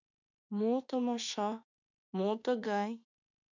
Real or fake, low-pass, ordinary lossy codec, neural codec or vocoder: fake; 7.2 kHz; MP3, 64 kbps; autoencoder, 48 kHz, 32 numbers a frame, DAC-VAE, trained on Japanese speech